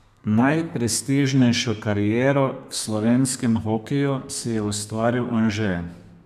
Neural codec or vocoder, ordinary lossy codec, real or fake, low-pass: codec, 32 kHz, 1.9 kbps, SNAC; none; fake; 14.4 kHz